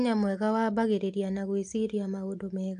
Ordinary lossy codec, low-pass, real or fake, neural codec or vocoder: AAC, 64 kbps; 9.9 kHz; real; none